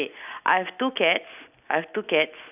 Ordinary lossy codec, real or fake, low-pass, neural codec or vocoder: none; real; 3.6 kHz; none